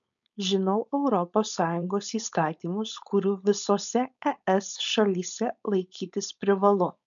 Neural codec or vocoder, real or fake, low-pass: codec, 16 kHz, 4.8 kbps, FACodec; fake; 7.2 kHz